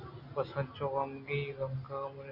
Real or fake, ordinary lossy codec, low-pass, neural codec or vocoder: real; Opus, 64 kbps; 5.4 kHz; none